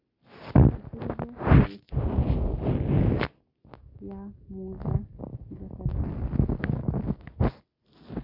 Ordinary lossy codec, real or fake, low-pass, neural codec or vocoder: none; real; 5.4 kHz; none